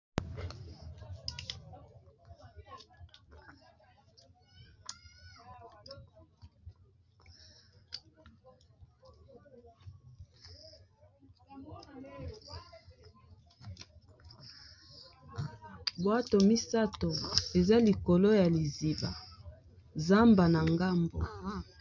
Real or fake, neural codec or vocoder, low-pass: real; none; 7.2 kHz